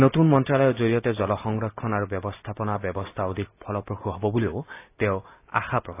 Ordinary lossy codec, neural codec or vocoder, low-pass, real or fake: AAC, 24 kbps; none; 3.6 kHz; real